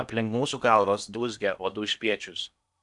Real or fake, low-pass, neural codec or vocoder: fake; 10.8 kHz; codec, 16 kHz in and 24 kHz out, 0.8 kbps, FocalCodec, streaming, 65536 codes